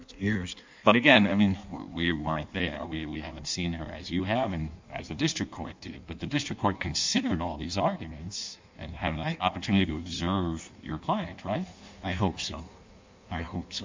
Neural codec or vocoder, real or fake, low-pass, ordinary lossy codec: codec, 16 kHz in and 24 kHz out, 1.1 kbps, FireRedTTS-2 codec; fake; 7.2 kHz; MP3, 64 kbps